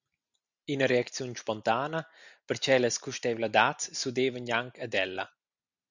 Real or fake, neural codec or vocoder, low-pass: real; none; 7.2 kHz